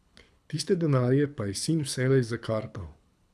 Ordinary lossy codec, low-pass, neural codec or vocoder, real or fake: none; none; codec, 24 kHz, 6 kbps, HILCodec; fake